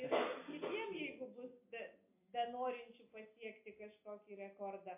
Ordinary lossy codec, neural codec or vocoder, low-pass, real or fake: MP3, 24 kbps; none; 3.6 kHz; real